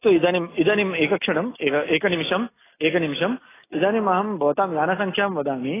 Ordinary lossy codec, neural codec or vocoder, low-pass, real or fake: AAC, 16 kbps; none; 3.6 kHz; real